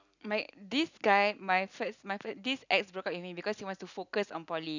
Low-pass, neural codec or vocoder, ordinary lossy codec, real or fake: 7.2 kHz; none; none; real